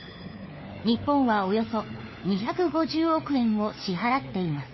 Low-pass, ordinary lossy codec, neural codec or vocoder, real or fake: 7.2 kHz; MP3, 24 kbps; codec, 16 kHz, 4 kbps, FunCodec, trained on LibriTTS, 50 frames a second; fake